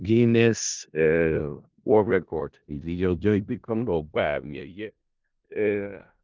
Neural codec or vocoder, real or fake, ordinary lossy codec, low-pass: codec, 16 kHz in and 24 kHz out, 0.4 kbps, LongCat-Audio-Codec, four codebook decoder; fake; Opus, 24 kbps; 7.2 kHz